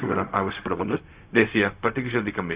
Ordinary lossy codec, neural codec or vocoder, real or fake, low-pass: Opus, 64 kbps; codec, 16 kHz, 0.4 kbps, LongCat-Audio-Codec; fake; 3.6 kHz